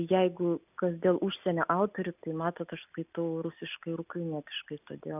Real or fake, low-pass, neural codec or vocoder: real; 3.6 kHz; none